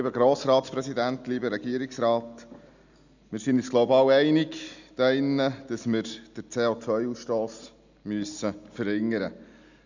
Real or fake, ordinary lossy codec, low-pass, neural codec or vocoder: real; none; 7.2 kHz; none